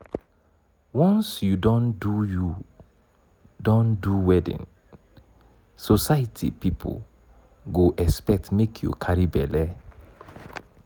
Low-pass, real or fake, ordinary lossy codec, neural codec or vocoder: none; real; none; none